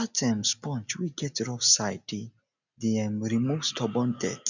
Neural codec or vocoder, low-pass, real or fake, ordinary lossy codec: none; 7.2 kHz; real; none